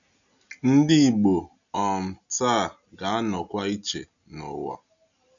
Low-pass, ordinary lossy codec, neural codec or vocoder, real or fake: 7.2 kHz; none; none; real